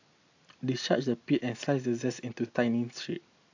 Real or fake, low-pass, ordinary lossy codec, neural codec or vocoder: real; 7.2 kHz; AAC, 48 kbps; none